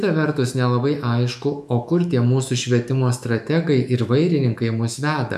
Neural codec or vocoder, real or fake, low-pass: autoencoder, 48 kHz, 128 numbers a frame, DAC-VAE, trained on Japanese speech; fake; 14.4 kHz